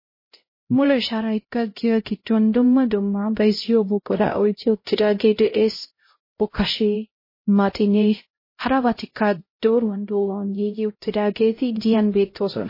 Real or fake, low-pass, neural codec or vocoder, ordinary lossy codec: fake; 5.4 kHz; codec, 16 kHz, 0.5 kbps, X-Codec, HuBERT features, trained on LibriSpeech; MP3, 24 kbps